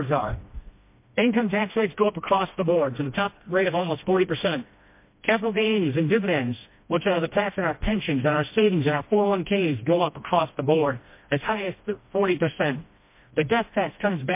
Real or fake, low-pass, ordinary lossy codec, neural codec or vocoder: fake; 3.6 kHz; MP3, 24 kbps; codec, 16 kHz, 1 kbps, FreqCodec, smaller model